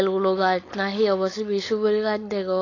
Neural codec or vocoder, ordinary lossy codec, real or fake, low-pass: codec, 16 kHz, 4.8 kbps, FACodec; AAC, 32 kbps; fake; 7.2 kHz